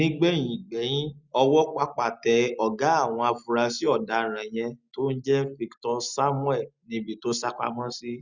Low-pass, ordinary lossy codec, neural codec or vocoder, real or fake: 7.2 kHz; Opus, 64 kbps; none; real